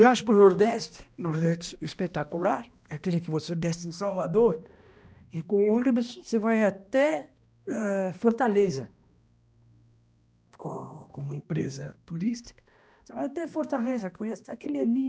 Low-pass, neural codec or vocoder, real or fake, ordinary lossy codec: none; codec, 16 kHz, 1 kbps, X-Codec, HuBERT features, trained on balanced general audio; fake; none